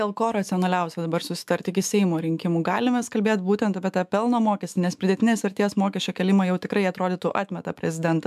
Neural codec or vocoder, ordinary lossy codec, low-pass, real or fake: autoencoder, 48 kHz, 128 numbers a frame, DAC-VAE, trained on Japanese speech; MP3, 96 kbps; 14.4 kHz; fake